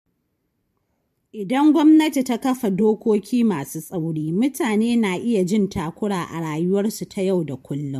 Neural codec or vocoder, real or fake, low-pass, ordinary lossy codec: vocoder, 44.1 kHz, 128 mel bands every 512 samples, BigVGAN v2; fake; 14.4 kHz; MP3, 64 kbps